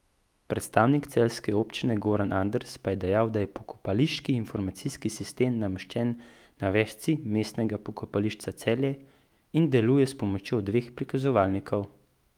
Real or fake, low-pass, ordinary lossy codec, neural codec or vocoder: fake; 19.8 kHz; Opus, 32 kbps; autoencoder, 48 kHz, 128 numbers a frame, DAC-VAE, trained on Japanese speech